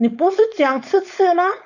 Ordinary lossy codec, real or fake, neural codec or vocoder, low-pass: none; fake; codec, 16 kHz, 4.8 kbps, FACodec; 7.2 kHz